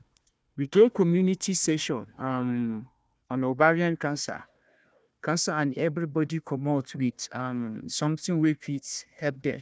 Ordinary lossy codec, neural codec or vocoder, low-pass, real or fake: none; codec, 16 kHz, 1 kbps, FunCodec, trained on Chinese and English, 50 frames a second; none; fake